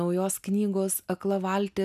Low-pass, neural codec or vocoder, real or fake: 14.4 kHz; none; real